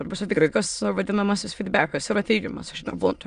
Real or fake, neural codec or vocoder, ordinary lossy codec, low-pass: fake; autoencoder, 22.05 kHz, a latent of 192 numbers a frame, VITS, trained on many speakers; Opus, 64 kbps; 9.9 kHz